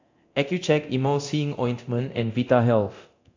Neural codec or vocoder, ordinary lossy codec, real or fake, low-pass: codec, 24 kHz, 0.9 kbps, DualCodec; AAC, 48 kbps; fake; 7.2 kHz